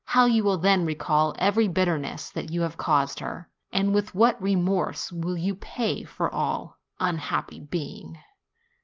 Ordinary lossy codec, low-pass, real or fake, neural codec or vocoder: Opus, 24 kbps; 7.2 kHz; real; none